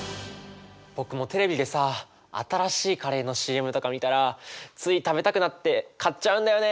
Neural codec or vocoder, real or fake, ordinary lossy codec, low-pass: none; real; none; none